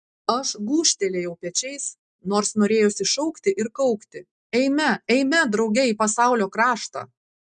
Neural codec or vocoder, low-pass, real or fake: none; 9.9 kHz; real